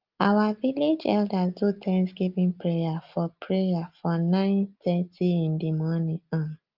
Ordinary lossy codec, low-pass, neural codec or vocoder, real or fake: Opus, 32 kbps; 5.4 kHz; none; real